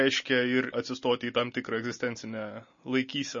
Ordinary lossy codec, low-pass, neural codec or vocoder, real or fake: MP3, 32 kbps; 7.2 kHz; none; real